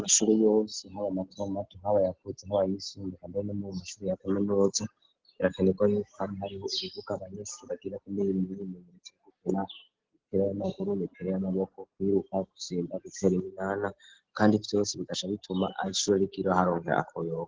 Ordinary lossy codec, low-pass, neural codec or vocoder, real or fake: Opus, 16 kbps; 7.2 kHz; none; real